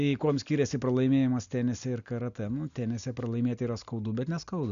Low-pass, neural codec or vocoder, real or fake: 7.2 kHz; none; real